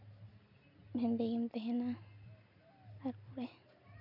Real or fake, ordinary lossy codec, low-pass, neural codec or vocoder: real; none; 5.4 kHz; none